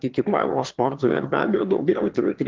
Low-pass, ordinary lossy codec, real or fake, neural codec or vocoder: 7.2 kHz; Opus, 16 kbps; fake; autoencoder, 22.05 kHz, a latent of 192 numbers a frame, VITS, trained on one speaker